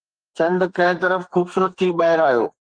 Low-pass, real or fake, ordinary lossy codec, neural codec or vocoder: 9.9 kHz; fake; Opus, 24 kbps; codec, 32 kHz, 1.9 kbps, SNAC